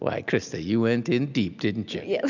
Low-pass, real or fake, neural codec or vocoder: 7.2 kHz; real; none